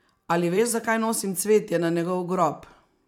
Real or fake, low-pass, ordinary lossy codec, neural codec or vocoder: fake; 19.8 kHz; none; vocoder, 44.1 kHz, 128 mel bands every 512 samples, BigVGAN v2